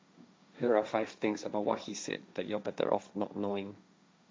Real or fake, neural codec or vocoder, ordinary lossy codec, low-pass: fake; codec, 16 kHz, 1.1 kbps, Voila-Tokenizer; none; none